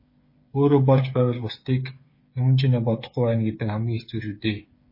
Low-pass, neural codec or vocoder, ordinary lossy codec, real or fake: 5.4 kHz; codec, 16 kHz, 8 kbps, FreqCodec, smaller model; MP3, 24 kbps; fake